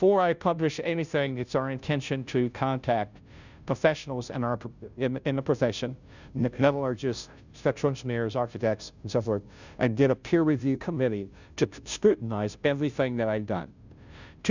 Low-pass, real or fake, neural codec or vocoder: 7.2 kHz; fake; codec, 16 kHz, 0.5 kbps, FunCodec, trained on Chinese and English, 25 frames a second